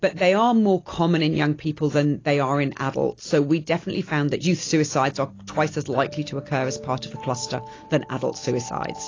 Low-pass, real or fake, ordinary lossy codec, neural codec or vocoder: 7.2 kHz; real; AAC, 32 kbps; none